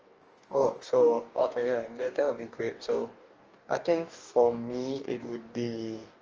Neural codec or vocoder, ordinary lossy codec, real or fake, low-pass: codec, 44.1 kHz, 2.6 kbps, DAC; Opus, 24 kbps; fake; 7.2 kHz